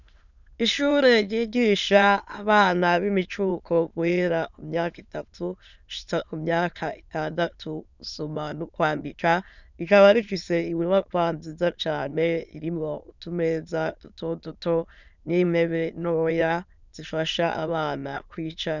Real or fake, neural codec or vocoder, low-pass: fake; autoencoder, 22.05 kHz, a latent of 192 numbers a frame, VITS, trained on many speakers; 7.2 kHz